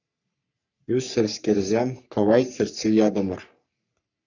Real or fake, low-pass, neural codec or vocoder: fake; 7.2 kHz; codec, 44.1 kHz, 3.4 kbps, Pupu-Codec